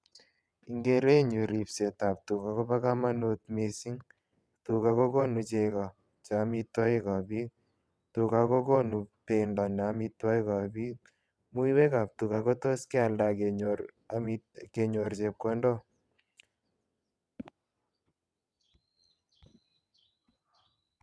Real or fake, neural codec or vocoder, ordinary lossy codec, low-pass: fake; vocoder, 22.05 kHz, 80 mel bands, WaveNeXt; none; none